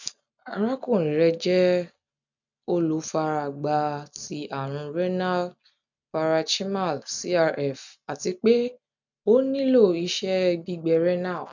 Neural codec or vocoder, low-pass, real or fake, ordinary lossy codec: none; 7.2 kHz; real; none